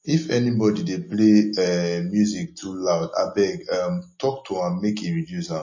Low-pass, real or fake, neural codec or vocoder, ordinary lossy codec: 7.2 kHz; real; none; MP3, 32 kbps